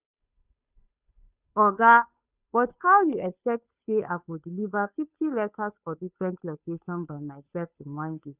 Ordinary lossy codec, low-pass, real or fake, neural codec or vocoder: none; 3.6 kHz; fake; codec, 16 kHz, 2 kbps, FunCodec, trained on Chinese and English, 25 frames a second